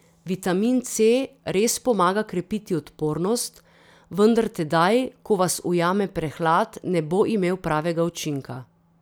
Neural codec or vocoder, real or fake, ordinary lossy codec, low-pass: none; real; none; none